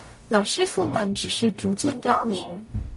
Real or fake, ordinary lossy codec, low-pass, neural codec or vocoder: fake; MP3, 48 kbps; 14.4 kHz; codec, 44.1 kHz, 0.9 kbps, DAC